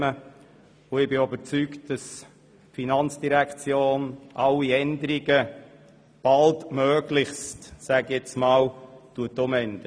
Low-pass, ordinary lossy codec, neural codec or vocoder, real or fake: none; none; none; real